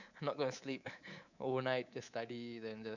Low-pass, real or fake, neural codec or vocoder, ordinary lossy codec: 7.2 kHz; fake; codec, 24 kHz, 3.1 kbps, DualCodec; none